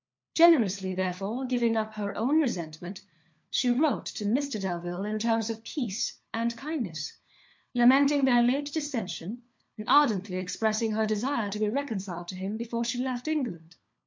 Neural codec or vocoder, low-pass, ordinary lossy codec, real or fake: codec, 16 kHz, 4 kbps, FunCodec, trained on LibriTTS, 50 frames a second; 7.2 kHz; MP3, 64 kbps; fake